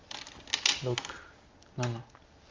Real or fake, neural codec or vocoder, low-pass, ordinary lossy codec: real; none; 7.2 kHz; Opus, 32 kbps